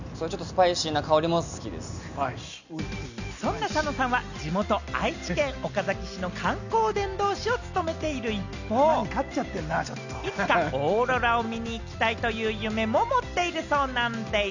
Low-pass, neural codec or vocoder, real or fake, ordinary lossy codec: 7.2 kHz; none; real; none